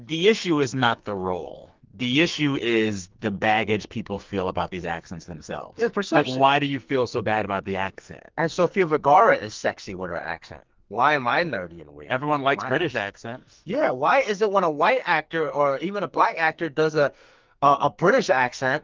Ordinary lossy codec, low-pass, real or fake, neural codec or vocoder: Opus, 24 kbps; 7.2 kHz; fake; codec, 44.1 kHz, 2.6 kbps, SNAC